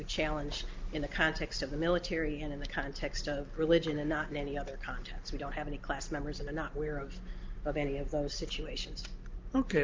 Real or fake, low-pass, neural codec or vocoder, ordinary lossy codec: real; 7.2 kHz; none; Opus, 32 kbps